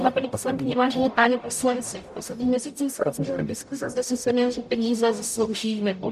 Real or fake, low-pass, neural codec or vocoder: fake; 14.4 kHz; codec, 44.1 kHz, 0.9 kbps, DAC